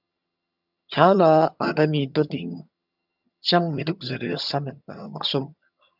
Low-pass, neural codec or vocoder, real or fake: 5.4 kHz; vocoder, 22.05 kHz, 80 mel bands, HiFi-GAN; fake